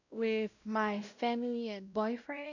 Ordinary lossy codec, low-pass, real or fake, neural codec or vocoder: none; 7.2 kHz; fake; codec, 16 kHz, 0.5 kbps, X-Codec, WavLM features, trained on Multilingual LibriSpeech